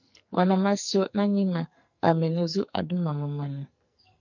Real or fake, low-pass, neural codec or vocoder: fake; 7.2 kHz; codec, 44.1 kHz, 2.6 kbps, SNAC